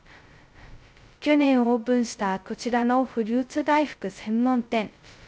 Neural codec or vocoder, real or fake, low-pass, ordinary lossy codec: codec, 16 kHz, 0.2 kbps, FocalCodec; fake; none; none